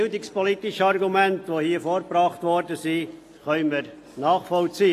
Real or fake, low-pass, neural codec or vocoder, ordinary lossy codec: real; 14.4 kHz; none; AAC, 48 kbps